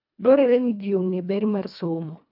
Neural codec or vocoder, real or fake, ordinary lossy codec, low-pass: codec, 24 kHz, 1.5 kbps, HILCodec; fake; AAC, 48 kbps; 5.4 kHz